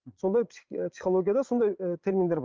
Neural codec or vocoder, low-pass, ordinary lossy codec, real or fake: vocoder, 22.05 kHz, 80 mel bands, Vocos; 7.2 kHz; Opus, 24 kbps; fake